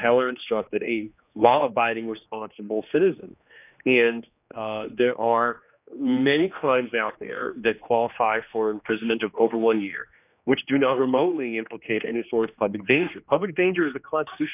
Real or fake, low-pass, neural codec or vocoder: fake; 3.6 kHz; codec, 16 kHz, 2 kbps, X-Codec, HuBERT features, trained on general audio